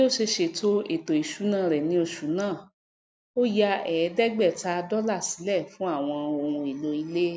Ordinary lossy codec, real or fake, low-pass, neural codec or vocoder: none; real; none; none